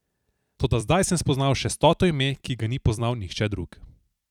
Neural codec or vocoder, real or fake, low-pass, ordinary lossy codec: vocoder, 44.1 kHz, 128 mel bands every 256 samples, BigVGAN v2; fake; 19.8 kHz; none